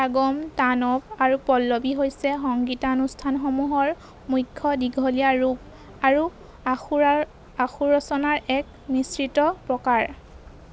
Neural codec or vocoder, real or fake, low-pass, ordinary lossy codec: none; real; none; none